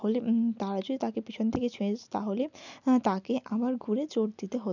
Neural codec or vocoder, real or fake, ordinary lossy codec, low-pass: none; real; none; 7.2 kHz